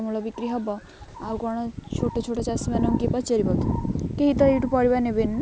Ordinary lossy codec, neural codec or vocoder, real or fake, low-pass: none; none; real; none